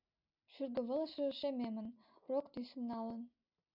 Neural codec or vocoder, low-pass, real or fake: none; 5.4 kHz; real